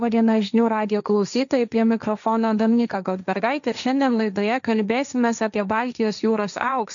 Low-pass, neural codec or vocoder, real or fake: 7.2 kHz; codec, 16 kHz, 1.1 kbps, Voila-Tokenizer; fake